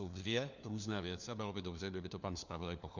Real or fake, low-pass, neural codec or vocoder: fake; 7.2 kHz; codec, 16 kHz, 4 kbps, FunCodec, trained on LibriTTS, 50 frames a second